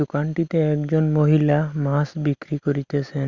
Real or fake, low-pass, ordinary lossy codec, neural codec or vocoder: real; 7.2 kHz; Opus, 64 kbps; none